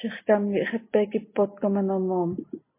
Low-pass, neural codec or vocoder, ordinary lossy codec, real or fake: 3.6 kHz; none; MP3, 24 kbps; real